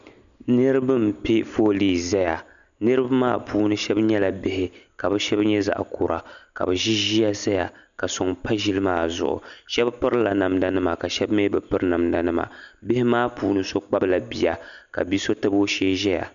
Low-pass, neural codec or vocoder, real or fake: 7.2 kHz; none; real